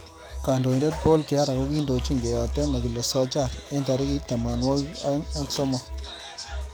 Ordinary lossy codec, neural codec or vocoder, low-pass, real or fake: none; codec, 44.1 kHz, 7.8 kbps, DAC; none; fake